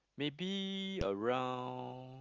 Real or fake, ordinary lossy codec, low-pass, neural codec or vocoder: real; Opus, 24 kbps; 7.2 kHz; none